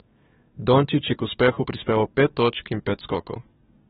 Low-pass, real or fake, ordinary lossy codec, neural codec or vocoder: 19.8 kHz; fake; AAC, 16 kbps; codec, 44.1 kHz, 7.8 kbps, DAC